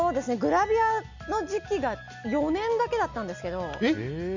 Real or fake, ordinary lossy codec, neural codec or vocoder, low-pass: real; none; none; 7.2 kHz